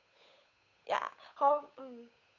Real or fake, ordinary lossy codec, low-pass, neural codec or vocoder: fake; none; 7.2 kHz; codec, 16 kHz, 8 kbps, FunCodec, trained on Chinese and English, 25 frames a second